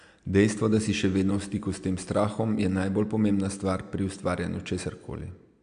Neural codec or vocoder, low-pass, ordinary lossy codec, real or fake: none; 9.9 kHz; AAC, 64 kbps; real